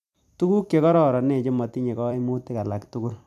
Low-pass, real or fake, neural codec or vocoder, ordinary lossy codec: 14.4 kHz; fake; vocoder, 44.1 kHz, 128 mel bands every 256 samples, BigVGAN v2; AAC, 96 kbps